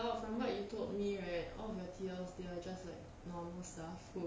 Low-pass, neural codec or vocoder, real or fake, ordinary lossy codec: none; none; real; none